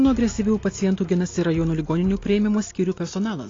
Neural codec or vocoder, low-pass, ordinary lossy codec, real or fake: none; 7.2 kHz; AAC, 32 kbps; real